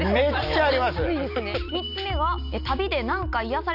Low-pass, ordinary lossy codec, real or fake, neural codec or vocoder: 5.4 kHz; none; real; none